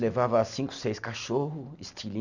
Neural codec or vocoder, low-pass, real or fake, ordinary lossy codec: none; 7.2 kHz; real; none